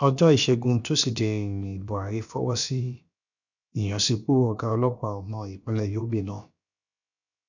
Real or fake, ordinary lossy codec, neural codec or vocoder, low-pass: fake; none; codec, 16 kHz, about 1 kbps, DyCAST, with the encoder's durations; 7.2 kHz